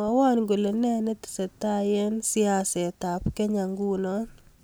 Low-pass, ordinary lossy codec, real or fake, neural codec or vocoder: none; none; real; none